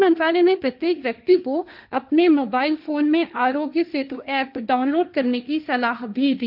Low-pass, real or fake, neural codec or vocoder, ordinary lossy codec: 5.4 kHz; fake; codec, 16 kHz, 1.1 kbps, Voila-Tokenizer; none